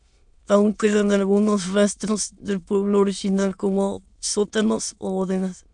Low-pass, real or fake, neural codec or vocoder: 9.9 kHz; fake; autoencoder, 22.05 kHz, a latent of 192 numbers a frame, VITS, trained on many speakers